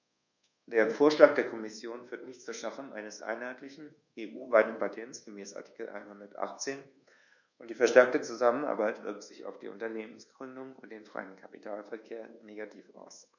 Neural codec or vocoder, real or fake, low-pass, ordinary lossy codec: codec, 24 kHz, 1.2 kbps, DualCodec; fake; 7.2 kHz; none